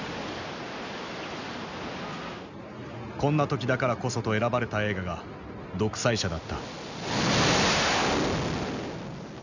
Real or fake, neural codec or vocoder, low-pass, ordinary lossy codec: real; none; 7.2 kHz; none